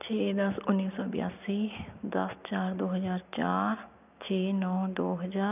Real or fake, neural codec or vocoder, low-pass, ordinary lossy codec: real; none; 3.6 kHz; none